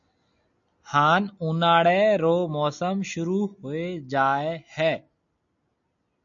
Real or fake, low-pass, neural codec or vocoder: real; 7.2 kHz; none